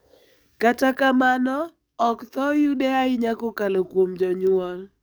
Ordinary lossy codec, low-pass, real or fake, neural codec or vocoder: none; none; fake; codec, 44.1 kHz, 7.8 kbps, DAC